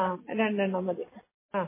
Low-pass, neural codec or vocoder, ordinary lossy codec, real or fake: 3.6 kHz; none; MP3, 16 kbps; real